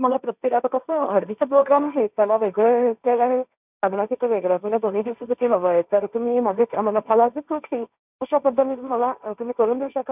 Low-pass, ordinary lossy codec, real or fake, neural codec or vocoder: 3.6 kHz; none; fake; codec, 16 kHz, 1.1 kbps, Voila-Tokenizer